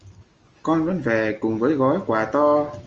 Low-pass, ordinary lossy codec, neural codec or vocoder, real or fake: 7.2 kHz; Opus, 24 kbps; none; real